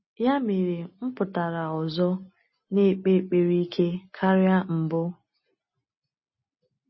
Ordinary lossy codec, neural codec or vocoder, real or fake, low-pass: MP3, 24 kbps; none; real; 7.2 kHz